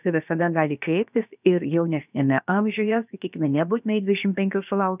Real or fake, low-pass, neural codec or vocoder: fake; 3.6 kHz; codec, 16 kHz, about 1 kbps, DyCAST, with the encoder's durations